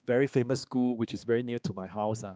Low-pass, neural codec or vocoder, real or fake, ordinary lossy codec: none; codec, 16 kHz, 2 kbps, X-Codec, HuBERT features, trained on balanced general audio; fake; none